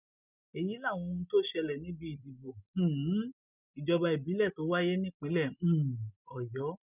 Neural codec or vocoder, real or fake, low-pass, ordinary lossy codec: none; real; 3.6 kHz; none